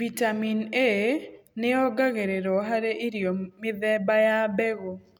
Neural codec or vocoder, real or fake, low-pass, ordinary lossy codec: none; real; 19.8 kHz; none